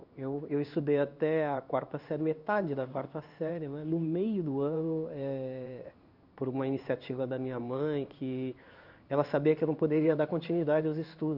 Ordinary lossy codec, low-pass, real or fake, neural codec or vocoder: none; 5.4 kHz; fake; codec, 16 kHz in and 24 kHz out, 1 kbps, XY-Tokenizer